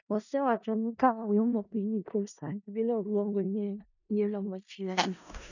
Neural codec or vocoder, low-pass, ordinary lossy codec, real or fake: codec, 16 kHz in and 24 kHz out, 0.4 kbps, LongCat-Audio-Codec, four codebook decoder; 7.2 kHz; none; fake